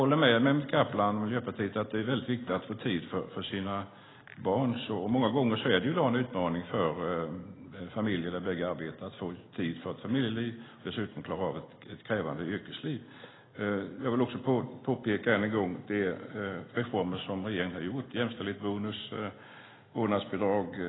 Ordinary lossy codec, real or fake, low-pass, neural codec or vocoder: AAC, 16 kbps; real; 7.2 kHz; none